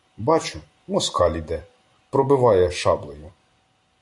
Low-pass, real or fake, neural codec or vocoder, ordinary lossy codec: 10.8 kHz; fake; vocoder, 44.1 kHz, 128 mel bands every 256 samples, BigVGAN v2; AAC, 64 kbps